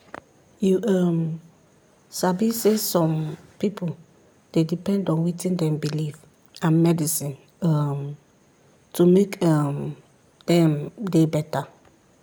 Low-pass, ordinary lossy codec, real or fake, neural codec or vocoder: none; none; real; none